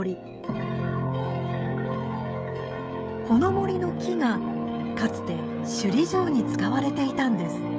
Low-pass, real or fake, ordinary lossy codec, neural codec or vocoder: none; fake; none; codec, 16 kHz, 16 kbps, FreqCodec, smaller model